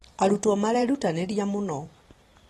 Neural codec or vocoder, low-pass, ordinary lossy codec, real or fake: vocoder, 44.1 kHz, 128 mel bands every 512 samples, BigVGAN v2; 19.8 kHz; AAC, 32 kbps; fake